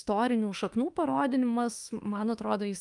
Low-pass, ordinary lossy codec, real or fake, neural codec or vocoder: 10.8 kHz; Opus, 32 kbps; fake; autoencoder, 48 kHz, 32 numbers a frame, DAC-VAE, trained on Japanese speech